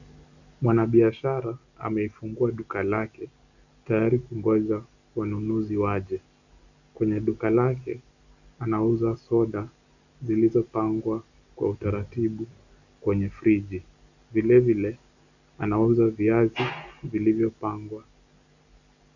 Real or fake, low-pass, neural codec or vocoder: real; 7.2 kHz; none